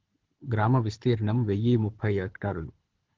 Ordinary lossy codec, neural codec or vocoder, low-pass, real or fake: Opus, 16 kbps; codec, 24 kHz, 6 kbps, HILCodec; 7.2 kHz; fake